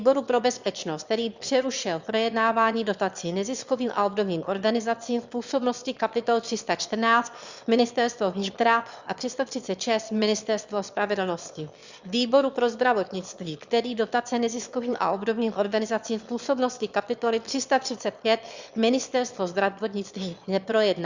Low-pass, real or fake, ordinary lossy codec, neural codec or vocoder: 7.2 kHz; fake; Opus, 64 kbps; autoencoder, 22.05 kHz, a latent of 192 numbers a frame, VITS, trained on one speaker